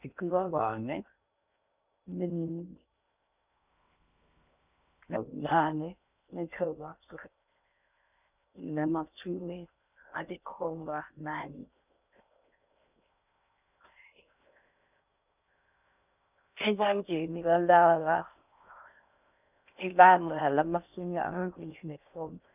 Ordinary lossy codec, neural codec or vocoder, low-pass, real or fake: none; codec, 16 kHz in and 24 kHz out, 0.6 kbps, FocalCodec, streaming, 2048 codes; 3.6 kHz; fake